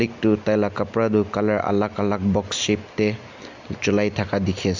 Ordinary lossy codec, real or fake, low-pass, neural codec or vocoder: MP3, 64 kbps; real; 7.2 kHz; none